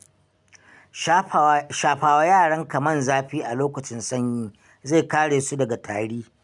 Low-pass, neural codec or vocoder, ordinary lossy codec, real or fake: 10.8 kHz; none; none; real